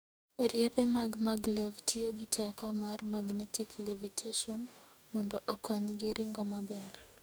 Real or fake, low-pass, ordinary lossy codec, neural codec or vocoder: fake; none; none; codec, 44.1 kHz, 2.6 kbps, DAC